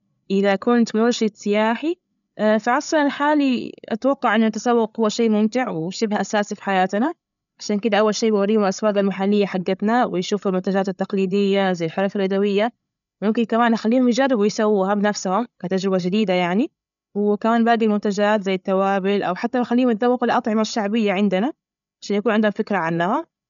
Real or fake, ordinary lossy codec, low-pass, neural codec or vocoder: fake; none; 7.2 kHz; codec, 16 kHz, 8 kbps, FreqCodec, larger model